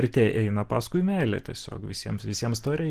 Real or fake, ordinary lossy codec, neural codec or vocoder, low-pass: real; Opus, 16 kbps; none; 14.4 kHz